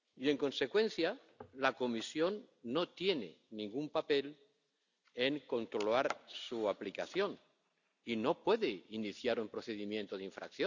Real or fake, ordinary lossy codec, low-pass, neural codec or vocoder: real; none; 7.2 kHz; none